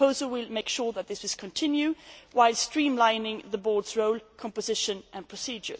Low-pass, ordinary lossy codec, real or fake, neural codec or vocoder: none; none; real; none